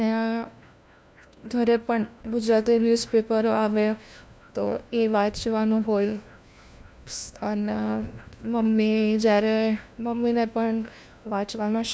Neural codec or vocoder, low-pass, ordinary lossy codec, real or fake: codec, 16 kHz, 1 kbps, FunCodec, trained on LibriTTS, 50 frames a second; none; none; fake